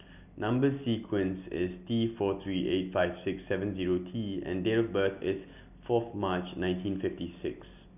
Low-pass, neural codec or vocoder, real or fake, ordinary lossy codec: 3.6 kHz; none; real; none